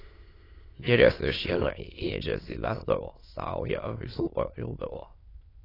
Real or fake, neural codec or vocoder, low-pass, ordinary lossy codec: fake; autoencoder, 22.05 kHz, a latent of 192 numbers a frame, VITS, trained on many speakers; 5.4 kHz; AAC, 24 kbps